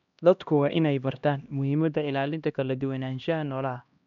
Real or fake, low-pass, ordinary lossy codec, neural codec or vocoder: fake; 7.2 kHz; none; codec, 16 kHz, 1 kbps, X-Codec, HuBERT features, trained on LibriSpeech